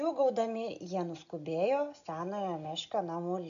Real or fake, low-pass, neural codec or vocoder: real; 7.2 kHz; none